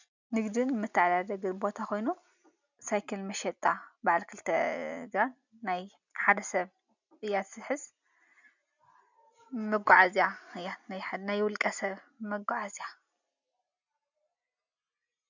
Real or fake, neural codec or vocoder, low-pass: real; none; 7.2 kHz